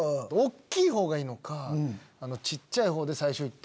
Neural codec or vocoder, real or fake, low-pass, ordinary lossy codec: none; real; none; none